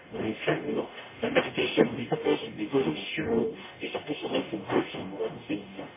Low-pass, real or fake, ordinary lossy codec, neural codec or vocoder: 3.6 kHz; fake; MP3, 16 kbps; codec, 44.1 kHz, 0.9 kbps, DAC